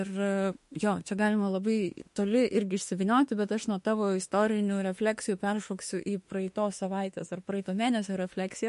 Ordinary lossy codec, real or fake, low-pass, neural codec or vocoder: MP3, 48 kbps; fake; 14.4 kHz; autoencoder, 48 kHz, 32 numbers a frame, DAC-VAE, trained on Japanese speech